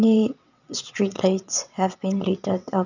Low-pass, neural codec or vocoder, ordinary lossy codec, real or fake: 7.2 kHz; vocoder, 22.05 kHz, 80 mel bands, WaveNeXt; none; fake